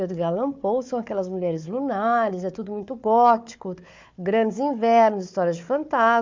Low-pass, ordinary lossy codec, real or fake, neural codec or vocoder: 7.2 kHz; MP3, 64 kbps; fake; codec, 16 kHz, 4 kbps, FunCodec, trained on Chinese and English, 50 frames a second